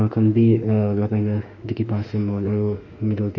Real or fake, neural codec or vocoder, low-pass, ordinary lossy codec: fake; autoencoder, 48 kHz, 32 numbers a frame, DAC-VAE, trained on Japanese speech; 7.2 kHz; none